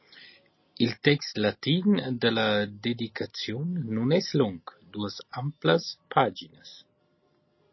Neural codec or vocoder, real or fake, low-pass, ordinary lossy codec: none; real; 7.2 kHz; MP3, 24 kbps